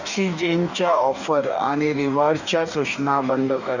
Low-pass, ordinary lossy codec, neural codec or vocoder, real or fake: 7.2 kHz; none; codec, 44.1 kHz, 2.6 kbps, DAC; fake